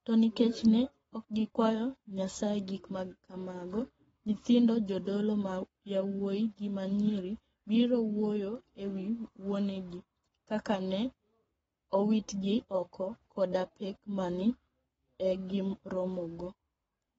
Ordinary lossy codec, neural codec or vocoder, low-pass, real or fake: AAC, 24 kbps; codec, 44.1 kHz, 7.8 kbps, Pupu-Codec; 19.8 kHz; fake